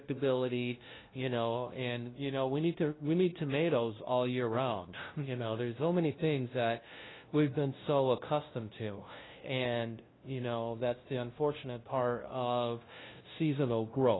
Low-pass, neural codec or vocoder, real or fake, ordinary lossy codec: 7.2 kHz; codec, 16 kHz, 0.5 kbps, FunCodec, trained on LibriTTS, 25 frames a second; fake; AAC, 16 kbps